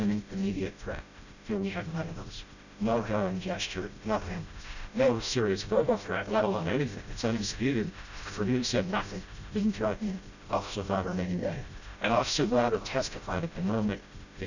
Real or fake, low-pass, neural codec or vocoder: fake; 7.2 kHz; codec, 16 kHz, 0.5 kbps, FreqCodec, smaller model